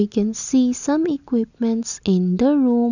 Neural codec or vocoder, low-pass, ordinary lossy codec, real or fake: none; 7.2 kHz; none; real